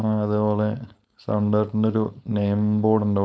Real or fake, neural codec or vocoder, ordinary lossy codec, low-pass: fake; codec, 16 kHz, 4.8 kbps, FACodec; none; none